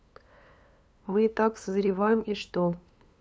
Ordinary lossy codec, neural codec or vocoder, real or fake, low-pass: none; codec, 16 kHz, 2 kbps, FunCodec, trained on LibriTTS, 25 frames a second; fake; none